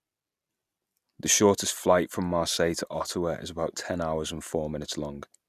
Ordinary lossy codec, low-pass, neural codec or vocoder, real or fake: none; 14.4 kHz; none; real